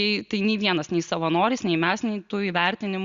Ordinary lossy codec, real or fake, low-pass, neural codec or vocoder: Opus, 64 kbps; real; 7.2 kHz; none